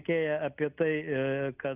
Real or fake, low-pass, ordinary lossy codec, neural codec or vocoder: real; 3.6 kHz; Opus, 64 kbps; none